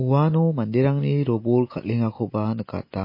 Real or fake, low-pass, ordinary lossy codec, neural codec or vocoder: real; 5.4 kHz; MP3, 24 kbps; none